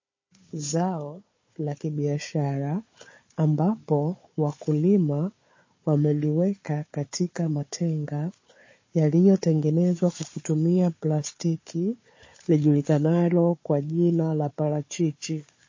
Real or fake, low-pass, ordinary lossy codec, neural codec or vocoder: fake; 7.2 kHz; MP3, 32 kbps; codec, 16 kHz, 4 kbps, FunCodec, trained on Chinese and English, 50 frames a second